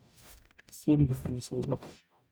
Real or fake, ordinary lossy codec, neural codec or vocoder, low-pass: fake; none; codec, 44.1 kHz, 0.9 kbps, DAC; none